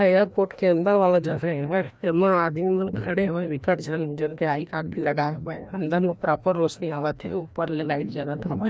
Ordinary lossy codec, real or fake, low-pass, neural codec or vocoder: none; fake; none; codec, 16 kHz, 1 kbps, FreqCodec, larger model